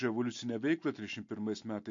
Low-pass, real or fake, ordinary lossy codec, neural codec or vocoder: 7.2 kHz; real; MP3, 48 kbps; none